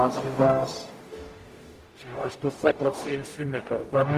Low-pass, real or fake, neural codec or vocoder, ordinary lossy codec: 14.4 kHz; fake; codec, 44.1 kHz, 0.9 kbps, DAC; Opus, 24 kbps